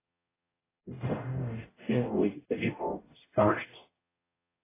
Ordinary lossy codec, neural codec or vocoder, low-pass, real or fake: AAC, 16 kbps; codec, 44.1 kHz, 0.9 kbps, DAC; 3.6 kHz; fake